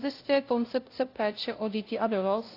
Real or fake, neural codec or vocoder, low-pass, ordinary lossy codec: fake; codec, 16 kHz, 0.5 kbps, FunCodec, trained on LibriTTS, 25 frames a second; 5.4 kHz; AAC, 32 kbps